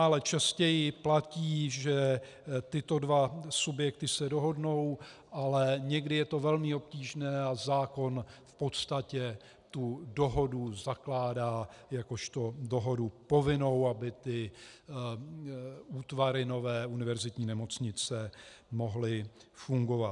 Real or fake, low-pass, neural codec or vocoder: real; 10.8 kHz; none